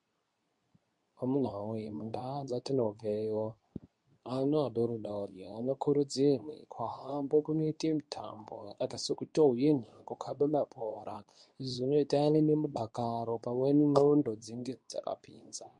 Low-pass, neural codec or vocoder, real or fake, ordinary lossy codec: 10.8 kHz; codec, 24 kHz, 0.9 kbps, WavTokenizer, medium speech release version 2; fake; MP3, 64 kbps